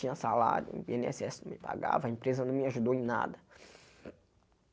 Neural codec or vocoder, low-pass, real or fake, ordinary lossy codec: none; none; real; none